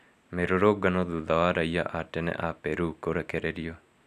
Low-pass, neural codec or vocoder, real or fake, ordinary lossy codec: 14.4 kHz; none; real; none